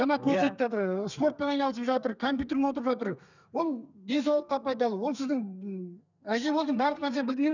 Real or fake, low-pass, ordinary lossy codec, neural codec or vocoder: fake; 7.2 kHz; none; codec, 32 kHz, 1.9 kbps, SNAC